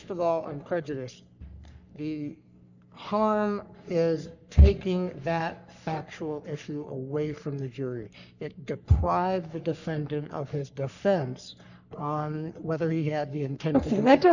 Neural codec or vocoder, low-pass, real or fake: codec, 44.1 kHz, 3.4 kbps, Pupu-Codec; 7.2 kHz; fake